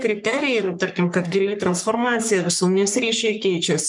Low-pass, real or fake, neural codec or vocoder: 10.8 kHz; fake; codec, 44.1 kHz, 3.4 kbps, Pupu-Codec